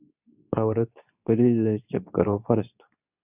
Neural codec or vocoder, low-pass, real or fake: codec, 24 kHz, 0.9 kbps, WavTokenizer, medium speech release version 2; 3.6 kHz; fake